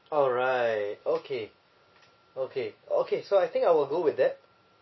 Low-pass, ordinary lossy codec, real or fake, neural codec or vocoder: 7.2 kHz; MP3, 24 kbps; real; none